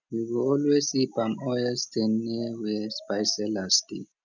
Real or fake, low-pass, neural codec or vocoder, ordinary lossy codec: real; 7.2 kHz; none; none